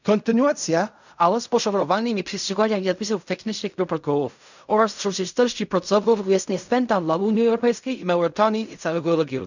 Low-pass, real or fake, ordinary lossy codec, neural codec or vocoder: 7.2 kHz; fake; none; codec, 16 kHz in and 24 kHz out, 0.4 kbps, LongCat-Audio-Codec, fine tuned four codebook decoder